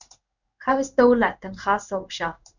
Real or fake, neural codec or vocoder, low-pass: fake; codec, 16 kHz in and 24 kHz out, 1 kbps, XY-Tokenizer; 7.2 kHz